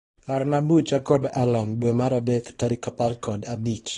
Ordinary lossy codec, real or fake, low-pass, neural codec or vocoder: AAC, 32 kbps; fake; 10.8 kHz; codec, 24 kHz, 0.9 kbps, WavTokenizer, small release